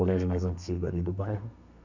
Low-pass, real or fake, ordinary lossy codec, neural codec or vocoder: 7.2 kHz; fake; none; codec, 32 kHz, 1.9 kbps, SNAC